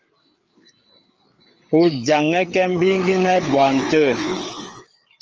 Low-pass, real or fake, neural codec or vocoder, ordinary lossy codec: 7.2 kHz; fake; codec, 16 kHz, 4 kbps, FreqCodec, larger model; Opus, 24 kbps